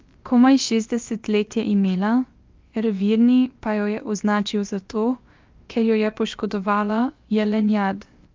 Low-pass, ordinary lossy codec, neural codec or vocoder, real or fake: 7.2 kHz; Opus, 24 kbps; codec, 16 kHz, about 1 kbps, DyCAST, with the encoder's durations; fake